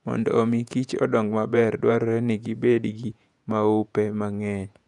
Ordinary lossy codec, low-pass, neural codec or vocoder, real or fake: none; 10.8 kHz; vocoder, 48 kHz, 128 mel bands, Vocos; fake